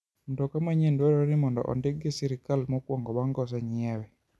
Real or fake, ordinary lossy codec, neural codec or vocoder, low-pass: real; none; none; none